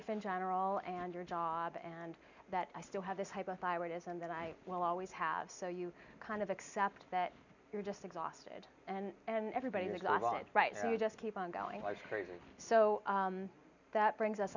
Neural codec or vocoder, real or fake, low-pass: none; real; 7.2 kHz